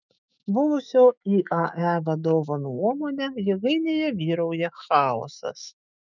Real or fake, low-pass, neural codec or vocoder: fake; 7.2 kHz; autoencoder, 48 kHz, 128 numbers a frame, DAC-VAE, trained on Japanese speech